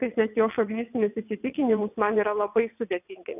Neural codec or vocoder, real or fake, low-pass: vocoder, 22.05 kHz, 80 mel bands, WaveNeXt; fake; 3.6 kHz